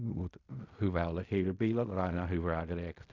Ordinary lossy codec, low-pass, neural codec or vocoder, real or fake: none; 7.2 kHz; codec, 16 kHz in and 24 kHz out, 0.4 kbps, LongCat-Audio-Codec, fine tuned four codebook decoder; fake